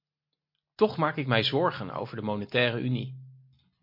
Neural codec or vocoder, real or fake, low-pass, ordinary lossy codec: none; real; 5.4 kHz; MP3, 32 kbps